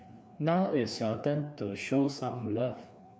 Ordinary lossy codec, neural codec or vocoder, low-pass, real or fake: none; codec, 16 kHz, 2 kbps, FreqCodec, larger model; none; fake